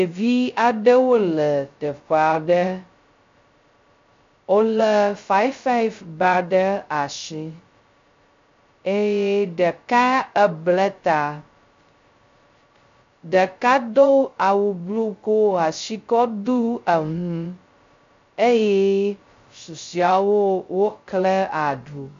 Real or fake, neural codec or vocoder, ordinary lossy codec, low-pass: fake; codec, 16 kHz, 0.2 kbps, FocalCodec; MP3, 48 kbps; 7.2 kHz